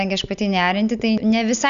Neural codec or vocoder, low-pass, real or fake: none; 7.2 kHz; real